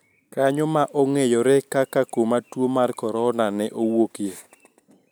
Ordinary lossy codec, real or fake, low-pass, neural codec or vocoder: none; real; none; none